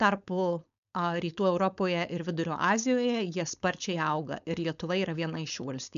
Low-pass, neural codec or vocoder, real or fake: 7.2 kHz; codec, 16 kHz, 4.8 kbps, FACodec; fake